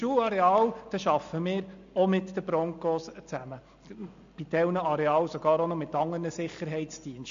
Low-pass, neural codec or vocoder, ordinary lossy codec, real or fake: 7.2 kHz; none; AAC, 64 kbps; real